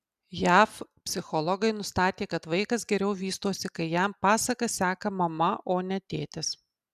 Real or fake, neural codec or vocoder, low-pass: real; none; 14.4 kHz